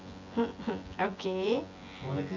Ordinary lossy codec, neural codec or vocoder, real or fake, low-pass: MP3, 48 kbps; vocoder, 24 kHz, 100 mel bands, Vocos; fake; 7.2 kHz